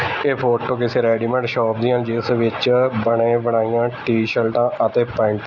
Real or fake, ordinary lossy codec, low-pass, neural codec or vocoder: real; none; 7.2 kHz; none